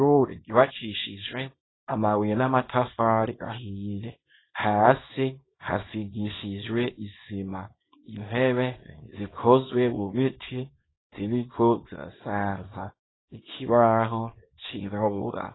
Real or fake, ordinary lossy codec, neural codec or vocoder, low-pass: fake; AAC, 16 kbps; codec, 24 kHz, 0.9 kbps, WavTokenizer, small release; 7.2 kHz